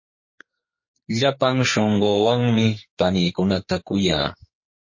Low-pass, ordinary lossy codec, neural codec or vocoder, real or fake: 7.2 kHz; MP3, 32 kbps; codec, 44.1 kHz, 2.6 kbps, SNAC; fake